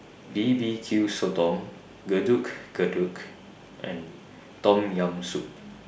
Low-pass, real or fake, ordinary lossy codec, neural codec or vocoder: none; real; none; none